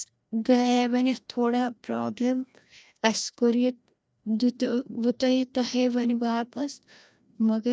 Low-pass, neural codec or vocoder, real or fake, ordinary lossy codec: none; codec, 16 kHz, 1 kbps, FreqCodec, larger model; fake; none